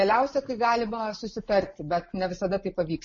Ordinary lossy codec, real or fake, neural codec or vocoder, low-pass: MP3, 32 kbps; real; none; 7.2 kHz